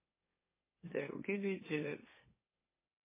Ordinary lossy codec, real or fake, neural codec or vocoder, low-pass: MP3, 16 kbps; fake; autoencoder, 44.1 kHz, a latent of 192 numbers a frame, MeloTTS; 3.6 kHz